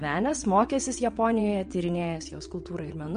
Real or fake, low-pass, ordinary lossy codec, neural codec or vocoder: fake; 9.9 kHz; MP3, 48 kbps; vocoder, 22.05 kHz, 80 mel bands, WaveNeXt